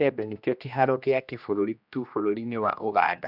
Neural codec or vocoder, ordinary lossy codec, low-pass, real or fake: codec, 16 kHz, 1 kbps, X-Codec, HuBERT features, trained on general audio; none; 5.4 kHz; fake